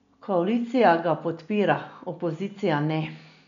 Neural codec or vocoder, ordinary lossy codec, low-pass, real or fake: none; none; 7.2 kHz; real